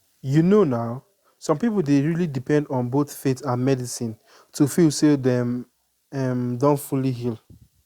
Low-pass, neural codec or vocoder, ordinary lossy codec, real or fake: 19.8 kHz; none; Opus, 64 kbps; real